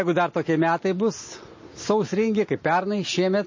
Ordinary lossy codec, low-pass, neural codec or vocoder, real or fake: MP3, 32 kbps; 7.2 kHz; none; real